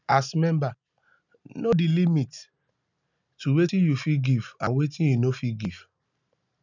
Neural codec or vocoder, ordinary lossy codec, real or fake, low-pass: none; none; real; 7.2 kHz